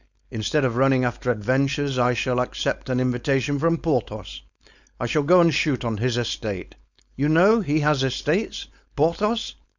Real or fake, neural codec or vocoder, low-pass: fake; codec, 16 kHz, 4.8 kbps, FACodec; 7.2 kHz